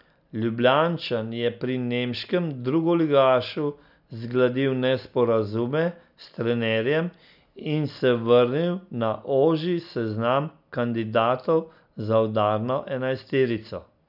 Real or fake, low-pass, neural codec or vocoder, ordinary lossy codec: real; 5.4 kHz; none; none